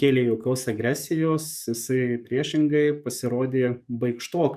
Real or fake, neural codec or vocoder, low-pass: fake; codec, 44.1 kHz, 7.8 kbps, DAC; 14.4 kHz